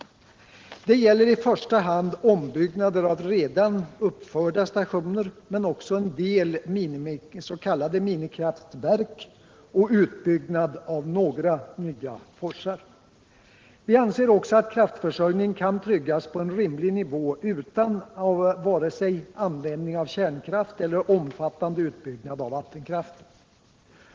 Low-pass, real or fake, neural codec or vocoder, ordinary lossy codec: 7.2 kHz; real; none; Opus, 16 kbps